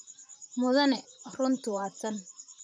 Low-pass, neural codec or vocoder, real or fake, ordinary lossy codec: 9.9 kHz; vocoder, 44.1 kHz, 128 mel bands, Pupu-Vocoder; fake; none